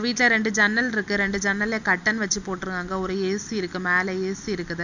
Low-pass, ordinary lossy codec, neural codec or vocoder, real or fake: 7.2 kHz; none; none; real